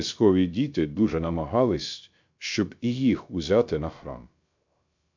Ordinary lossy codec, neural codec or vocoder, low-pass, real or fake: MP3, 64 kbps; codec, 16 kHz, 0.3 kbps, FocalCodec; 7.2 kHz; fake